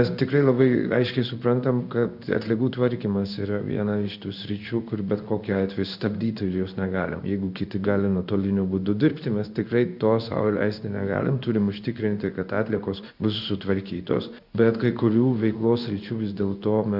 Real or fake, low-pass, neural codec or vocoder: fake; 5.4 kHz; codec, 16 kHz in and 24 kHz out, 1 kbps, XY-Tokenizer